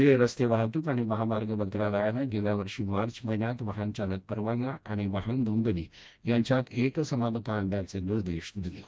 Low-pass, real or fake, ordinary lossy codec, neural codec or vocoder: none; fake; none; codec, 16 kHz, 1 kbps, FreqCodec, smaller model